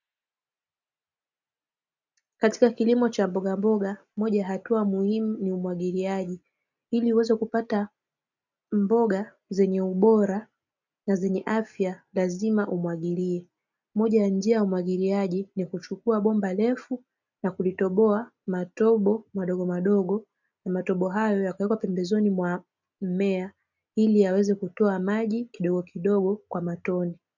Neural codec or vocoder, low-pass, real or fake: none; 7.2 kHz; real